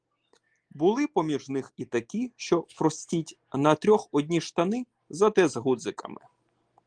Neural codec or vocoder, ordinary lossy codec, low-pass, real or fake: none; Opus, 24 kbps; 9.9 kHz; real